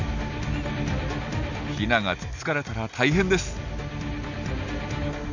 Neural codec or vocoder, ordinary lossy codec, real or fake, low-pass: none; none; real; 7.2 kHz